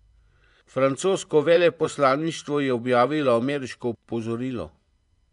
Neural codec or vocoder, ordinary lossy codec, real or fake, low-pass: vocoder, 24 kHz, 100 mel bands, Vocos; none; fake; 10.8 kHz